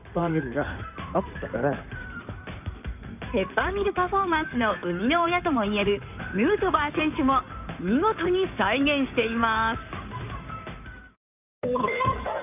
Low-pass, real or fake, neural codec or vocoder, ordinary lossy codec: 3.6 kHz; fake; codec, 16 kHz in and 24 kHz out, 2.2 kbps, FireRedTTS-2 codec; none